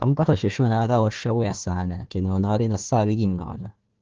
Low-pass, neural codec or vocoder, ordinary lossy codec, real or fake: 7.2 kHz; codec, 16 kHz, 1 kbps, FunCodec, trained on Chinese and English, 50 frames a second; Opus, 16 kbps; fake